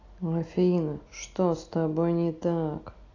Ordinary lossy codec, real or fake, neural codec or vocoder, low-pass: AAC, 32 kbps; real; none; 7.2 kHz